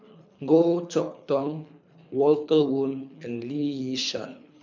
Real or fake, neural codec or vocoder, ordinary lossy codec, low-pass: fake; codec, 24 kHz, 3 kbps, HILCodec; MP3, 64 kbps; 7.2 kHz